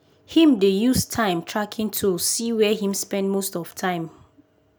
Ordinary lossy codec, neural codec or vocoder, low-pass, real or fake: none; none; none; real